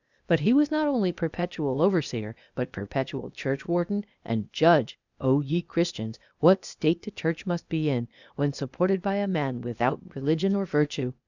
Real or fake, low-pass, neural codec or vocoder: fake; 7.2 kHz; codec, 16 kHz, 0.8 kbps, ZipCodec